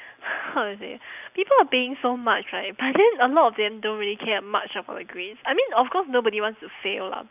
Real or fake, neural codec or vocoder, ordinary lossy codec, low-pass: real; none; none; 3.6 kHz